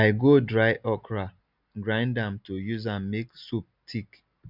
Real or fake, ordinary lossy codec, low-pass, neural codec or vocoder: real; none; 5.4 kHz; none